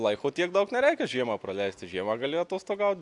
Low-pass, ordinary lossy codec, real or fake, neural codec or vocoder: 10.8 kHz; AAC, 64 kbps; real; none